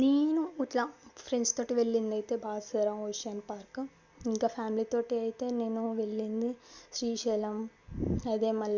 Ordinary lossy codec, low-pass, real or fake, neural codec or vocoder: Opus, 64 kbps; 7.2 kHz; real; none